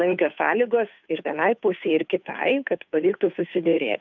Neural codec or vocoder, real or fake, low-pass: codec, 16 kHz, 2 kbps, FunCodec, trained on Chinese and English, 25 frames a second; fake; 7.2 kHz